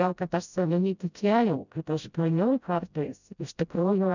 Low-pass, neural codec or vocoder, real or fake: 7.2 kHz; codec, 16 kHz, 0.5 kbps, FreqCodec, smaller model; fake